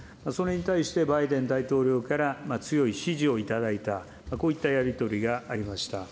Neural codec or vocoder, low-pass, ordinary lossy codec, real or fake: none; none; none; real